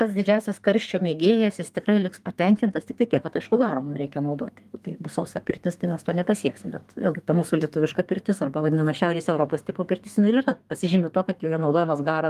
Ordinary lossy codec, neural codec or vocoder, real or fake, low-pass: Opus, 32 kbps; codec, 32 kHz, 1.9 kbps, SNAC; fake; 14.4 kHz